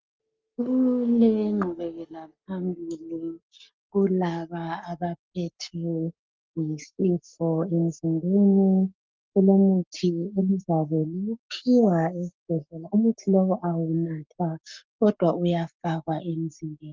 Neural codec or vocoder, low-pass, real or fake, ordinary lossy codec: codec, 44.1 kHz, 7.8 kbps, Pupu-Codec; 7.2 kHz; fake; Opus, 32 kbps